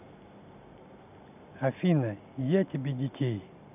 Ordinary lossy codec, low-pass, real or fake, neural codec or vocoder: none; 3.6 kHz; real; none